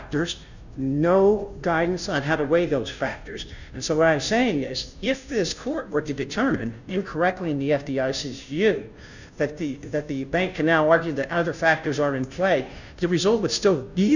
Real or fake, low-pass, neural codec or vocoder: fake; 7.2 kHz; codec, 16 kHz, 0.5 kbps, FunCodec, trained on Chinese and English, 25 frames a second